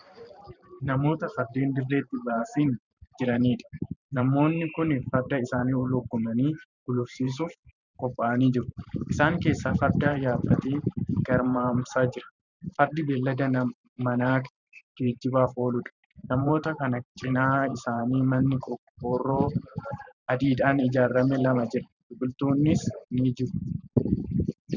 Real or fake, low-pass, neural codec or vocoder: fake; 7.2 kHz; vocoder, 44.1 kHz, 128 mel bands every 512 samples, BigVGAN v2